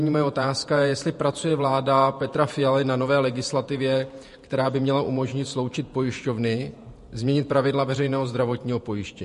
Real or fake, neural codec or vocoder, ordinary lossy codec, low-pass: fake; vocoder, 48 kHz, 128 mel bands, Vocos; MP3, 48 kbps; 14.4 kHz